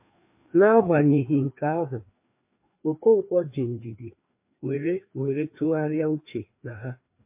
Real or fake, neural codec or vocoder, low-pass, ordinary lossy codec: fake; codec, 16 kHz, 2 kbps, FreqCodec, larger model; 3.6 kHz; MP3, 32 kbps